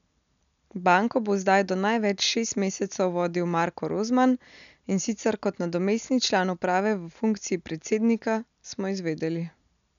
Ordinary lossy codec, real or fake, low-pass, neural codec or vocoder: none; real; 7.2 kHz; none